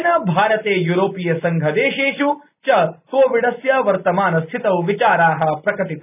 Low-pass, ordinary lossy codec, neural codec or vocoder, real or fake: 3.6 kHz; none; none; real